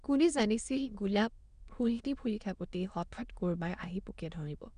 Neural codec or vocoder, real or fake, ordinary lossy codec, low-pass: autoencoder, 22.05 kHz, a latent of 192 numbers a frame, VITS, trained on many speakers; fake; Opus, 64 kbps; 9.9 kHz